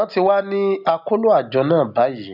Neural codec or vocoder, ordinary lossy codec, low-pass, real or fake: none; none; 5.4 kHz; real